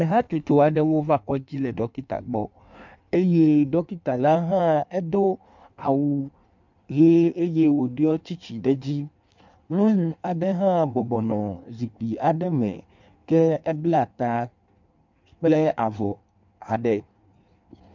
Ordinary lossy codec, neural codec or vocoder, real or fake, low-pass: MP3, 64 kbps; codec, 16 kHz in and 24 kHz out, 1.1 kbps, FireRedTTS-2 codec; fake; 7.2 kHz